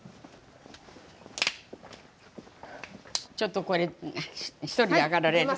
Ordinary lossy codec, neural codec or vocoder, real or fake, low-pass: none; none; real; none